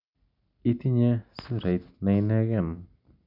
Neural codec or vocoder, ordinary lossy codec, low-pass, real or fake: none; none; 5.4 kHz; real